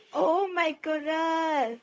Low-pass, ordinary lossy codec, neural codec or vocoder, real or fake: none; none; codec, 16 kHz, 0.4 kbps, LongCat-Audio-Codec; fake